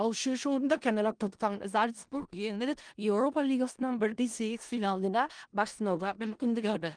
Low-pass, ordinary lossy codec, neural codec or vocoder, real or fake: 9.9 kHz; Opus, 24 kbps; codec, 16 kHz in and 24 kHz out, 0.4 kbps, LongCat-Audio-Codec, four codebook decoder; fake